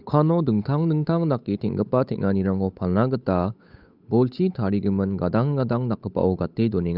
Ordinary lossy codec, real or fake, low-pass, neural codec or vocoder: none; fake; 5.4 kHz; codec, 16 kHz, 8 kbps, FunCodec, trained on Chinese and English, 25 frames a second